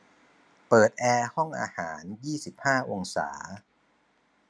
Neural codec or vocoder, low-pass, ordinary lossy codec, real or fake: none; none; none; real